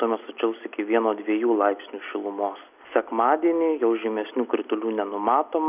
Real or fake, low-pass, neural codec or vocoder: real; 3.6 kHz; none